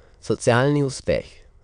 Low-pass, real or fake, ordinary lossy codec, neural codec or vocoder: 9.9 kHz; fake; none; autoencoder, 22.05 kHz, a latent of 192 numbers a frame, VITS, trained on many speakers